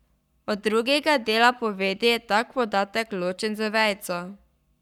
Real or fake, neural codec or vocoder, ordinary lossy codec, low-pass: fake; codec, 44.1 kHz, 7.8 kbps, Pupu-Codec; none; 19.8 kHz